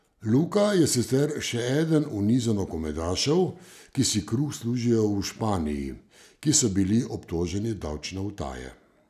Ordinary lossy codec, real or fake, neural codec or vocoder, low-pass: none; real; none; 14.4 kHz